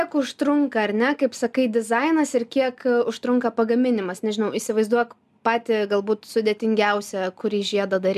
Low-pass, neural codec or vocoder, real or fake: 14.4 kHz; none; real